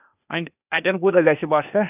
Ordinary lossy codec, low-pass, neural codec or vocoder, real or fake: none; 3.6 kHz; codec, 16 kHz, 0.8 kbps, ZipCodec; fake